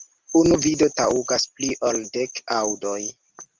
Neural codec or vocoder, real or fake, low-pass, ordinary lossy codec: none; real; 7.2 kHz; Opus, 32 kbps